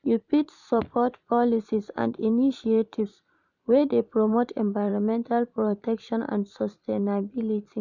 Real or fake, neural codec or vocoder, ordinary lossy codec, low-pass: fake; vocoder, 44.1 kHz, 128 mel bands every 512 samples, BigVGAN v2; none; 7.2 kHz